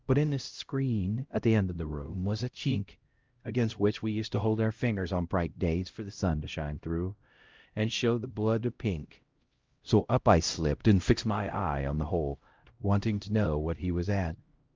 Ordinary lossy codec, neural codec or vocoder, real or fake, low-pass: Opus, 32 kbps; codec, 16 kHz, 0.5 kbps, X-Codec, HuBERT features, trained on LibriSpeech; fake; 7.2 kHz